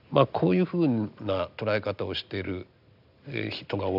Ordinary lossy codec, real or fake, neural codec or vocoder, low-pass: none; real; none; 5.4 kHz